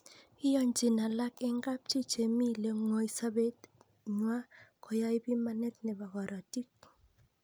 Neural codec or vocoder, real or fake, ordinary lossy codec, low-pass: none; real; none; none